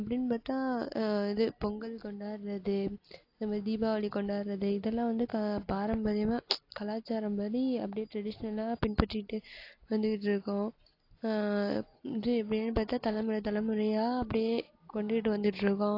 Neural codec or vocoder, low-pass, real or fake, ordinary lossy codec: none; 5.4 kHz; real; AAC, 32 kbps